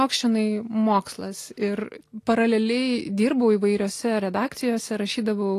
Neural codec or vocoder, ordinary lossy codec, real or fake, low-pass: none; AAC, 48 kbps; real; 14.4 kHz